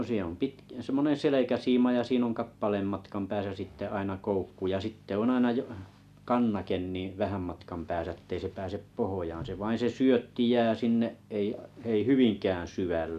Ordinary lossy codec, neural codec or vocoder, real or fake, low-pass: none; none; real; 14.4 kHz